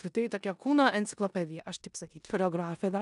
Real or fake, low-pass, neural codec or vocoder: fake; 10.8 kHz; codec, 16 kHz in and 24 kHz out, 0.9 kbps, LongCat-Audio-Codec, four codebook decoder